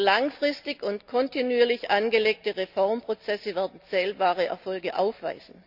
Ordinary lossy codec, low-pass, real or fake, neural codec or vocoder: none; 5.4 kHz; real; none